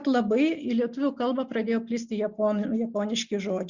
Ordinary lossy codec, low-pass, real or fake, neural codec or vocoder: Opus, 64 kbps; 7.2 kHz; real; none